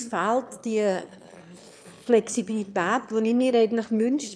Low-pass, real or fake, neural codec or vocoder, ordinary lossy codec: none; fake; autoencoder, 22.05 kHz, a latent of 192 numbers a frame, VITS, trained on one speaker; none